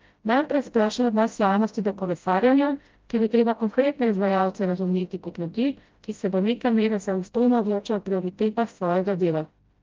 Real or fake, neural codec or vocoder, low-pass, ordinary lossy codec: fake; codec, 16 kHz, 0.5 kbps, FreqCodec, smaller model; 7.2 kHz; Opus, 24 kbps